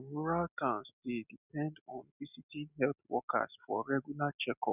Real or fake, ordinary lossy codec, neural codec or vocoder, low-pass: real; none; none; 3.6 kHz